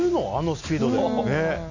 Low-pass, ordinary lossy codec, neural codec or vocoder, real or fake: 7.2 kHz; none; none; real